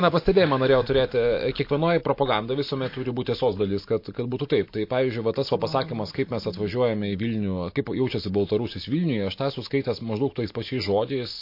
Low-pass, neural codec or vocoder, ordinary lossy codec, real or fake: 5.4 kHz; none; MP3, 32 kbps; real